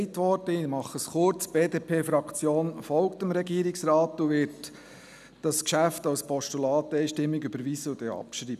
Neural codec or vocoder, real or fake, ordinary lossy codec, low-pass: none; real; none; 14.4 kHz